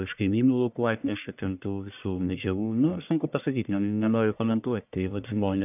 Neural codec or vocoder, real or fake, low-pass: codec, 44.1 kHz, 1.7 kbps, Pupu-Codec; fake; 3.6 kHz